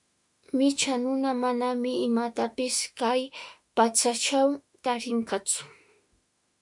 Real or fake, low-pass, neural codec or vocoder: fake; 10.8 kHz; autoencoder, 48 kHz, 32 numbers a frame, DAC-VAE, trained on Japanese speech